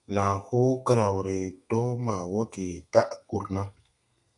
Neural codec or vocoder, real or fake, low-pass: codec, 32 kHz, 1.9 kbps, SNAC; fake; 10.8 kHz